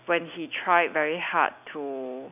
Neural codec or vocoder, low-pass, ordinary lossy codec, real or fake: none; 3.6 kHz; none; real